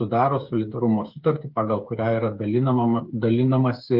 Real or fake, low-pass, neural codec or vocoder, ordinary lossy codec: fake; 5.4 kHz; codec, 16 kHz, 8 kbps, FreqCodec, smaller model; Opus, 32 kbps